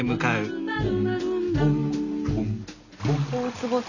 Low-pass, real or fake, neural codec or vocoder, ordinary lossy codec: 7.2 kHz; real; none; none